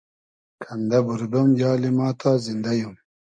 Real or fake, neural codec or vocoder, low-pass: real; none; 9.9 kHz